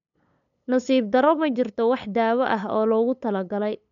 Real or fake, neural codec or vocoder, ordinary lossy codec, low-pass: fake; codec, 16 kHz, 8 kbps, FunCodec, trained on LibriTTS, 25 frames a second; none; 7.2 kHz